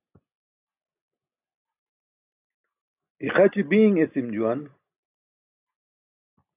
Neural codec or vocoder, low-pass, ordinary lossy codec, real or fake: none; 3.6 kHz; AAC, 32 kbps; real